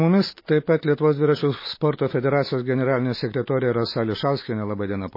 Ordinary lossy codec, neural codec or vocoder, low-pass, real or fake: MP3, 24 kbps; none; 5.4 kHz; real